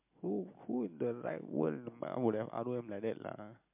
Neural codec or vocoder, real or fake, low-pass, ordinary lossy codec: none; real; 3.6 kHz; MP3, 32 kbps